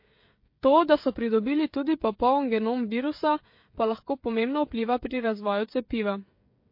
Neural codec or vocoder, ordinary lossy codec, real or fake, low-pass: codec, 16 kHz, 16 kbps, FreqCodec, smaller model; MP3, 32 kbps; fake; 5.4 kHz